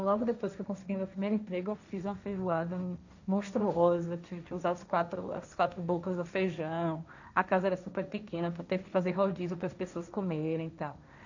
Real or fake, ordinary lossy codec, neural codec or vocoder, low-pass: fake; none; codec, 16 kHz, 1.1 kbps, Voila-Tokenizer; 7.2 kHz